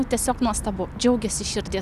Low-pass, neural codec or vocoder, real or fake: 14.4 kHz; none; real